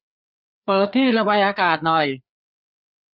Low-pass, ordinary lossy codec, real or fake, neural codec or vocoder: 5.4 kHz; none; fake; codec, 16 kHz, 4 kbps, FreqCodec, larger model